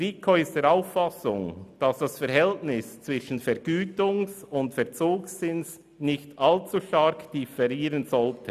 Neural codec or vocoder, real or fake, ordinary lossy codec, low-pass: none; real; none; 14.4 kHz